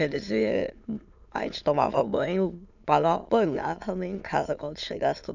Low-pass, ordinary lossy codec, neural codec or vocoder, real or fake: 7.2 kHz; none; autoencoder, 22.05 kHz, a latent of 192 numbers a frame, VITS, trained on many speakers; fake